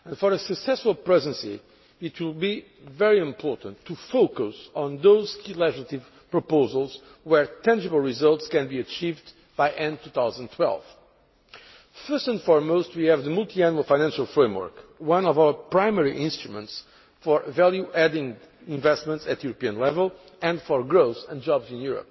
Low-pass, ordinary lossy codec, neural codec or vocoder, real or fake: 7.2 kHz; MP3, 24 kbps; none; real